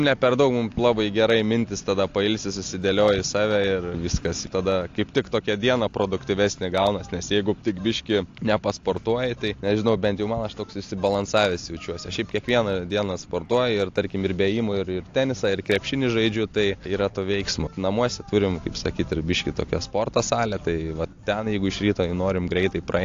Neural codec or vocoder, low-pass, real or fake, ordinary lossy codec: none; 7.2 kHz; real; AAC, 48 kbps